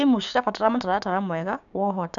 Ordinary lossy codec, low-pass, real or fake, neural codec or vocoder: none; 7.2 kHz; fake; codec, 16 kHz, 6 kbps, DAC